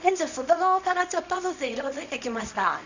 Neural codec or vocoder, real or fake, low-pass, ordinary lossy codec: codec, 24 kHz, 0.9 kbps, WavTokenizer, small release; fake; 7.2 kHz; Opus, 64 kbps